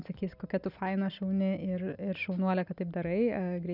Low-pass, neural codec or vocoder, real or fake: 5.4 kHz; none; real